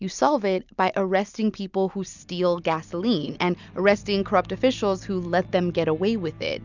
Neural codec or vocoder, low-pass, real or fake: none; 7.2 kHz; real